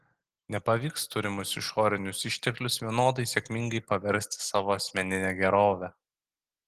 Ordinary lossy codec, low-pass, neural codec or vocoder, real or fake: Opus, 16 kbps; 14.4 kHz; none; real